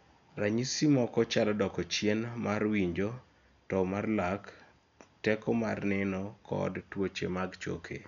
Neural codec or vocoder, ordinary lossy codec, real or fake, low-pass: none; none; real; 7.2 kHz